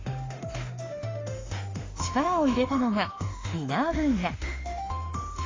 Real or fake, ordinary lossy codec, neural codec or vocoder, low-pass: fake; AAC, 32 kbps; autoencoder, 48 kHz, 32 numbers a frame, DAC-VAE, trained on Japanese speech; 7.2 kHz